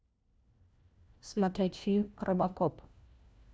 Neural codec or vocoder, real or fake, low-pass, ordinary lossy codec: codec, 16 kHz, 1 kbps, FunCodec, trained on LibriTTS, 50 frames a second; fake; none; none